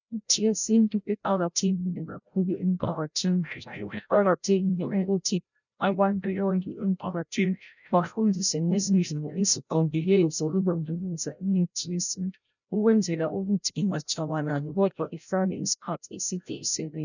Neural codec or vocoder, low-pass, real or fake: codec, 16 kHz, 0.5 kbps, FreqCodec, larger model; 7.2 kHz; fake